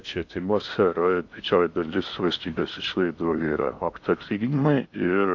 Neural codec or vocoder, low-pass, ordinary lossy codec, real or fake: codec, 16 kHz in and 24 kHz out, 0.8 kbps, FocalCodec, streaming, 65536 codes; 7.2 kHz; AAC, 48 kbps; fake